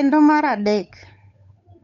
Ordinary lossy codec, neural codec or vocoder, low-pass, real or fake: none; codec, 16 kHz, 8 kbps, FunCodec, trained on Chinese and English, 25 frames a second; 7.2 kHz; fake